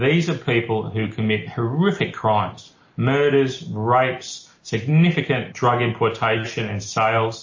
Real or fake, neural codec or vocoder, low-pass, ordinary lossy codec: real; none; 7.2 kHz; MP3, 32 kbps